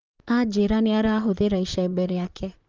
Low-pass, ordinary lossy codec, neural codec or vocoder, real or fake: 7.2 kHz; Opus, 24 kbps; vocoder, 44.1 kHz, 128 mel bands, Pupu-Vocoder; fake